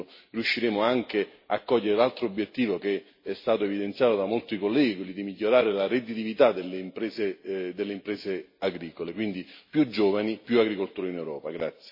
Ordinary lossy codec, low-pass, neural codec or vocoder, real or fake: MP3, 24 kbps; 5.4 kHz; none; real